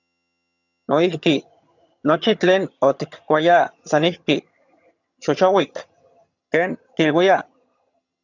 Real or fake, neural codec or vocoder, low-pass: fake; vocoder, 22.05 kHz, 80 mel bands, HiFi-GAN; 7.2 kHz